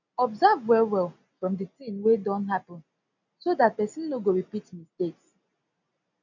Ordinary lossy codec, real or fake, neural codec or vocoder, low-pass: none; real; none; 7.2 kHz